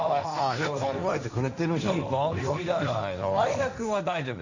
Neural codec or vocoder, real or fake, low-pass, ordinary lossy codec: codec, 16 kHz, 1.1 kbps, Voila-Tokenizer; fake; 7.2 kHz; none